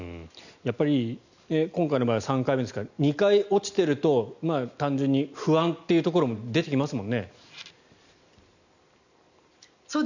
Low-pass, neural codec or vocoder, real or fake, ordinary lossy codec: 7.2 kHz; none; real; none